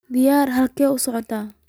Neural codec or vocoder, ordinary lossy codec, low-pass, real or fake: none; none; none; real